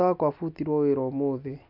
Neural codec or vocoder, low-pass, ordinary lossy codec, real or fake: none; 5.4 kHz; none; real